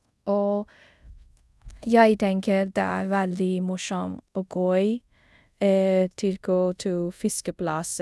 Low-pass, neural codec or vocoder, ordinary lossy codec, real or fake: none; codec, 24 kHz, 0.5 kbps, DualCodec; none; fake